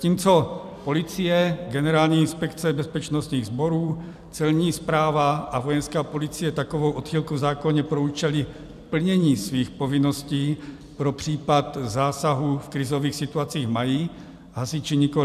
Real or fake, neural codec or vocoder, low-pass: fake; vocoder, 44.1 kHz, 128 mel bands every 256 samples, BigVGAN v2; 14.4 kHz